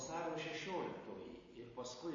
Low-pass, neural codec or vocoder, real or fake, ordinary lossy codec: 7.2 kHz; none; real; MP3, 32 kbps